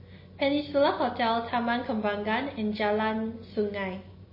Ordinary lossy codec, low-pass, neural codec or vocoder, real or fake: MP3, 24 kbps; 5.4 kHz; none; real